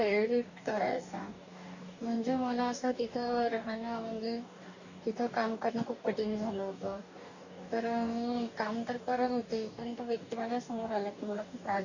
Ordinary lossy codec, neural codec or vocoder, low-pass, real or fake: none; codec, 44.1 kHz, 2.6 kbps, DAC; 7.2 kHz; fake